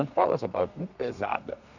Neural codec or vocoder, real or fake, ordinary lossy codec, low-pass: codec, 32 kHz, 1.9 kbps, SNAC; fake; none; 7.2 kHz